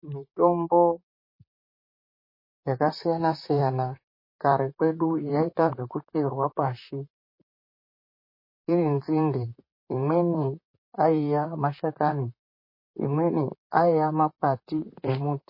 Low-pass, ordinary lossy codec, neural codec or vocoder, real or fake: 5.4 kHz; MP3, 24 kbps; vocoder, 44.1 kHz, 128 mel bands, Pupu-Vocoder; fake